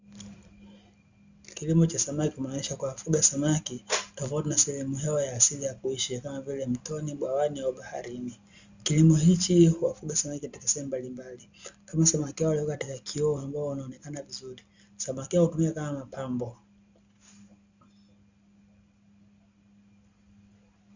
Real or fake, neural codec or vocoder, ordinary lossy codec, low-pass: real; none; Opus, 64 kbps; 7.2 kHz